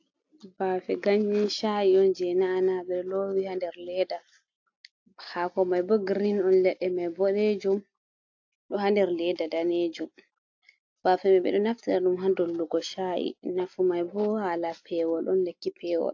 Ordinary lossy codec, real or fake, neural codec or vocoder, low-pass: AAC, 48 kbps; real; none; 7.2 kHz